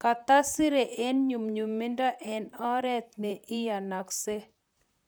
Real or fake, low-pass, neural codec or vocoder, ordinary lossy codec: fake; none; vocoder, 44.1 kHz, 128 mel bands, Pupu-Vocoder; none